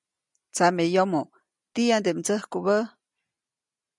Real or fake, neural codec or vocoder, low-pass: real; none; 10.8 kHz